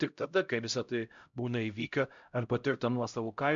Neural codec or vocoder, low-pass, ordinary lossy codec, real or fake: codec, 16 kHz, 0.5 kbps, X-Codec, HuBERT features, trained on LibriSpeech; 7.2 kHz; MP3, 64 kbps; fake